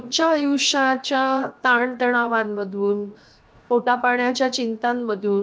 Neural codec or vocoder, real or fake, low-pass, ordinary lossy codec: codec, 16 kHz, 0.7 kbps, FocalCodec; fake; none; none